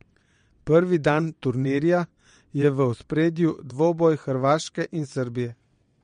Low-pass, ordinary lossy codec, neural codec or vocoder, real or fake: 9.9 kHz; MP3, 48 kbps; vocoder, 22.05 kHz, 80 mel bands, WaveNeXt; fake